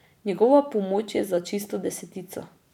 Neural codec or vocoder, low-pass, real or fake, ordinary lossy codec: vocoder, 44.1 kHz, 128 mel bands every 512 samples, BigVGAN v2; 19.8 kHz; fake; none